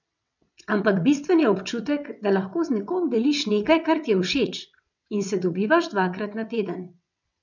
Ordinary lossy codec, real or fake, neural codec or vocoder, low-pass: none; fake; vocoder, 22.05 kHz, 80 mel bands, WaveNeXt; 7.2 kHz